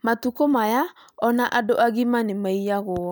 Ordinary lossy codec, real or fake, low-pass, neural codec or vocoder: none; real; none; none